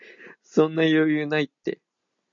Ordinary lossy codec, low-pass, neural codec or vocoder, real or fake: MP3, 48 kbps; 7.2 kHz; none; real